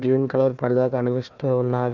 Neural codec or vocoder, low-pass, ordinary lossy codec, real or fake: codec, 16 kHz, 1 kbps, FunCodec, trained on Chinese and English, 50 frames a second; 7.2 kHz; none; fake